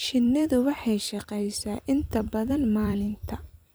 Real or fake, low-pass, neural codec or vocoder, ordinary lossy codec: fake; none; vocoder, 44.1 kHz, 128 mel bands every 256 samples, BigVGAN v2; none